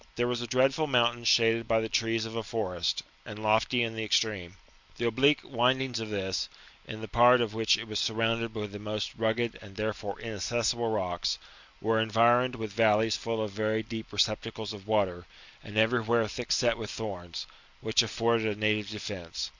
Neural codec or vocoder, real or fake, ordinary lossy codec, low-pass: none; real; Opus, 64 kbps; 7.2 kHz